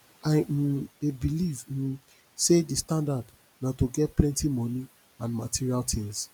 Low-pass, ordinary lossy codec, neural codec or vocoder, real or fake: none; none; none; real